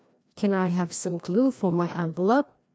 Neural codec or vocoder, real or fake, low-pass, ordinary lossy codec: codec, 16 kHz, 1 kbps, FreqCodec, larger model; fake; none; none